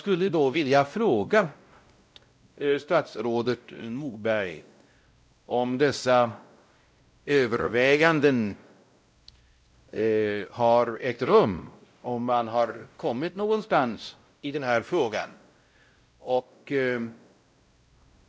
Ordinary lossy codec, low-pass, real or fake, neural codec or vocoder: none; none; fake; codec, 16 kHz, 0.5 kbps, X-Codec, WavLM features, trained on Multilingual LibriSpeech